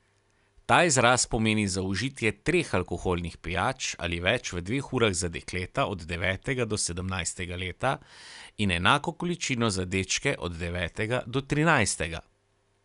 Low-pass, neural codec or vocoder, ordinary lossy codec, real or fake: 10.8 kHz; none; none; real